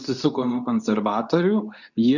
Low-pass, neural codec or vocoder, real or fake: 7.2 kHz; codec, 24 kHz, 0.9 kbps, WavTokenizer, medium speech release version 2; fake